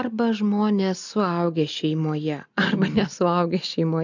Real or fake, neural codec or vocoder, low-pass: real; none; 7.2 kHz